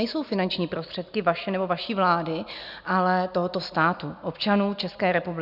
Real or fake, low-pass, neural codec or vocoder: real; 5.4 kHz; none